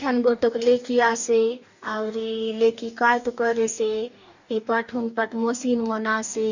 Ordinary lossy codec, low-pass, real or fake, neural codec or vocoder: none; 7.2 kHz; fake; codec, 44.1 kHz, 2.6 kbps, DAC